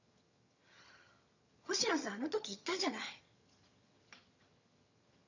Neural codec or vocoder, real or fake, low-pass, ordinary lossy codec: vocoder, 22.05 kHz, 80 mel bands, HiFi-GAN; fake; 7.2 kHz; none